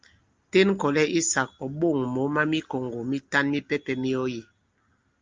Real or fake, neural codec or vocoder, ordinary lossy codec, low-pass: real; none; Opus, 24 kbps; 7.2 kHz